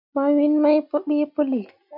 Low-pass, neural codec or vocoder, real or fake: 5.4 kHz; none; real